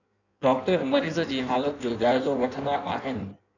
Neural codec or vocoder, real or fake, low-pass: codec, 16 kHz in and 24 kHz out, 1.1 kbps, FireRedTTS-2 codec; fake; 7.2 kHz